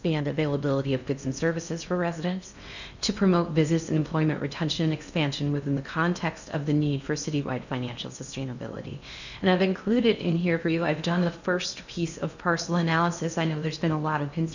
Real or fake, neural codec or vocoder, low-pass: fake; codec, 16 kHz in and 24 kHz out, 0.6 kbps, FocalCodec, streaming, 4096 codes; 7.2 kHz